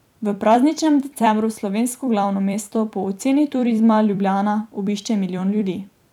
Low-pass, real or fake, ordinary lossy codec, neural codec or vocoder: 19.8 kHz; fake; none; vocoder, 44.1 kHz, 128 mel bands every 256 samples, BigVGAN v2